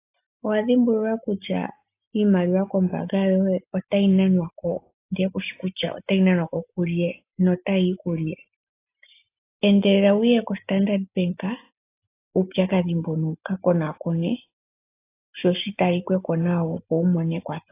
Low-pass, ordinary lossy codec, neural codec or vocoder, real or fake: 3.6 kHz; AAC, 24 kbps; none; real